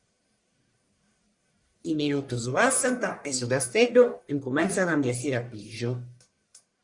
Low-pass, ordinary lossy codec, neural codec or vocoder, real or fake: 10.8 kHz; Opus, 64 kbps; codec, 44.1 kHz, 1.7 kbps, Pupu-Codec; fake